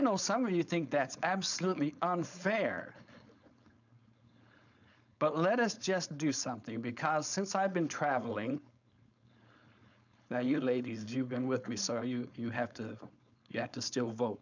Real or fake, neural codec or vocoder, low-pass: fake; codec, 16 kHz, 4.8 kbps, FACodec; 7.2 kHz